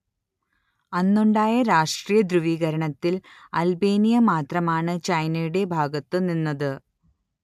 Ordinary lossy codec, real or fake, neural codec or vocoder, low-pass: AAC, 96 kbps; real; none; 14.4 kHz